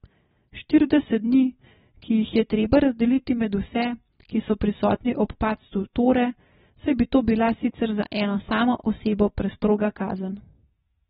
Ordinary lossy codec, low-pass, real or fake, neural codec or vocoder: AAC, 16 kbps; 19.8 kHz; real; none